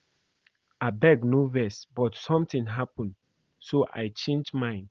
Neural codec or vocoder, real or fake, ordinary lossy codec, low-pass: none; real; Opus, 16 kbps; 7.2 kHz